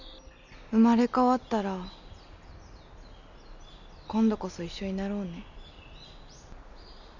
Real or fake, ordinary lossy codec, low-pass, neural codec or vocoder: real; none; 7.2 kHz; none